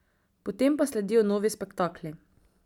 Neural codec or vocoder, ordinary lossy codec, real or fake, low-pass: none; none; real; 19.8 kHz